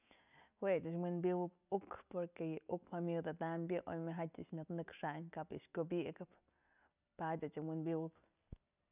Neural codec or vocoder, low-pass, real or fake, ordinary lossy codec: none; 3.6 kHz; real; none